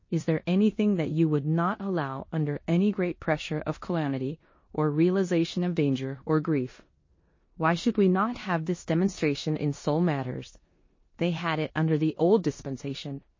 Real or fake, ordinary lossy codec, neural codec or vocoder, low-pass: fake; MP3, 32 kbps; codec, 16 kHz in and 24 kHz out, 0.9 kbps, LongCat-Audio-Codec, four codebook decoder; 7.2 kHz